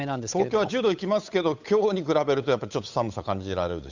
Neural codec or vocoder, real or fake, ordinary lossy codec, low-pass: codec, 16 kHz, 8 kbps, FunCodec, trained on Chinese and English, 25 frames a second; fake; none; 7.2 kHz